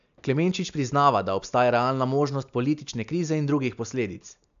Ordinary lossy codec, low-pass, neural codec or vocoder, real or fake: AAC, 96 kbps; 7.2 kHz; none; real